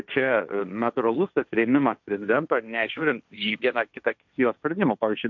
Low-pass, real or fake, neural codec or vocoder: 7.2 kHz; fake; codec, 16 kHz, 1.1 kbps, Voila-Tokenizer